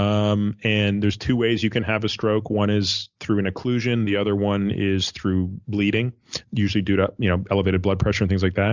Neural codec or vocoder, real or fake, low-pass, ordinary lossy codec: none; real; 7.2 kHz; Opus, 64 kbps